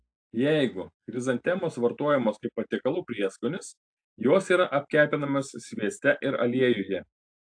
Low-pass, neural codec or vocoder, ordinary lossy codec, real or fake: 9.9 kHz; none; AAC, 64 kbps; real